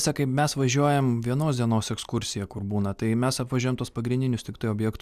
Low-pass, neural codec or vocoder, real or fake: 14.4 kHz; none; real